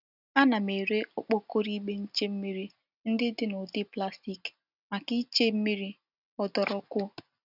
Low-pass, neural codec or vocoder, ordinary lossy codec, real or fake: 5.4 kHz; none; none; real